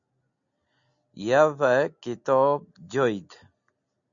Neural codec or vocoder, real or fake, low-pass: none; real; 7.2 kHz